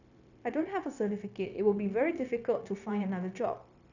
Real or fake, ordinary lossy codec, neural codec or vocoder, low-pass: fake; none; codec, 16 kHz, 0.9 kbps, LongCat-Audio-Codec; 7.2 kHz